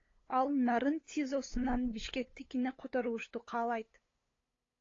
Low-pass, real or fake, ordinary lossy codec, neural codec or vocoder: 7.2 kHz; fake; AAC, 32 kbps; codec, 16 kHz, 8 kbps, FunCodec, trained on LibriTTS, 25 frames a second